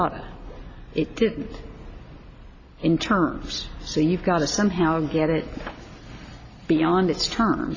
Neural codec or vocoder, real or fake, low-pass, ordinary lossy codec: none; real; 7.2 kHz; MP3, 32 kbps